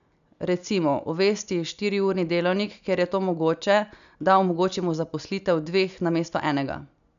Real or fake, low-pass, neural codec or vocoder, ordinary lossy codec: real; 7.2 kHz; none; none